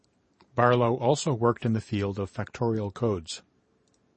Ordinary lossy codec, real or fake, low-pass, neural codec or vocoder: MP3, 32 kbps; fake; 10.8 kHz; vocoder, 44.1 kHz, 128 mel bands every 512 samples, BigVGAN v2